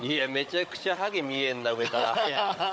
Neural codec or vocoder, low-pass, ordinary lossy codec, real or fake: codec, 16 kHz, 16 kbps, FunCodec, trained on Chinese and English, 50 frames a second; none; none; fake